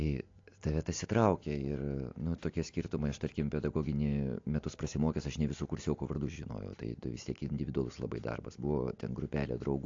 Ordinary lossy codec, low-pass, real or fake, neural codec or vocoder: AAC, 48 kbps; 7.2 kHz; real; none